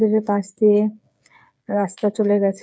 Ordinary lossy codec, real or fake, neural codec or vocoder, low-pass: none; fake; codec, 16 kHz, 8 kbps, FreqCodec, smaller model; none